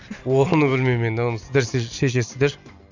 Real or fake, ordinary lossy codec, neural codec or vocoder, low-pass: real; none; none; 7.2 kHz